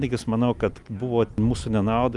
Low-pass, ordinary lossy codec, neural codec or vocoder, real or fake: 10.8 kHz; Opus, 32 kbps; none; real